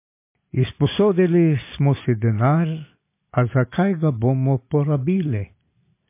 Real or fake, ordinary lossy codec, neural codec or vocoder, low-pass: real; MP3, 24 kbps; none; 3.6 kHz